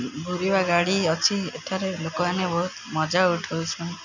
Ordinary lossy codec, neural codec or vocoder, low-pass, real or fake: none; none; 7.2 kHz; real